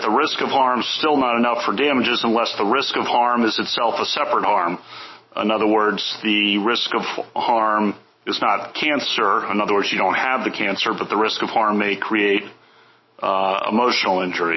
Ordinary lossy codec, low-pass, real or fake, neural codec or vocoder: MP3, 24 kbps; 7.2 kHz; fake; autoencoder, 48 kHz, 128 numbers a frame, DAC-VAE, trained on Japanese speech